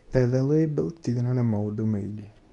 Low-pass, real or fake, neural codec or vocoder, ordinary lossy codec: 10.8 kHz; fake; codec, 24 kHz, 0.9 kbps, WavTokenizer, medium speech release version 2; none